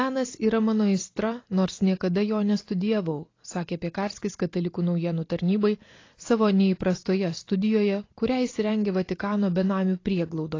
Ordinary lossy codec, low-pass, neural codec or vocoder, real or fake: AAC, 32 kbps; 7.2 kHz; none; real